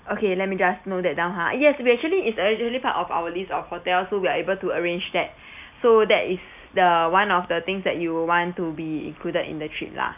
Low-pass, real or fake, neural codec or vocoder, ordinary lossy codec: 3.6 kHz; real; none; none